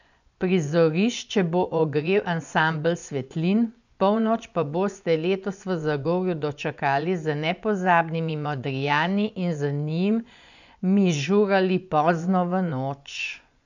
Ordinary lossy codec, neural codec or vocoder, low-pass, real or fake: none; vocoder, 24 kHz, 100 mel bands, Vocos; 7.2 kHz; fake